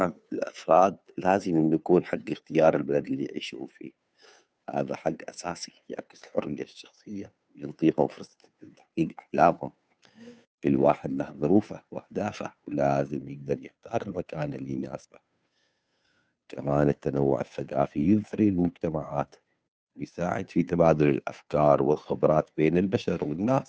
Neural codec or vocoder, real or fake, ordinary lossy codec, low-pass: codec, 16 kHz, 2 kbps, FunCodec, trained on Chinese and English, 25 frames a second; fake; none; none